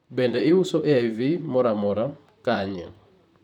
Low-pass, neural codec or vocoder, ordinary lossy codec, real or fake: 19.8 kHz; vocoder, 44.1 kHz, 128 mel bands, Pupu-Vocoder; none; fake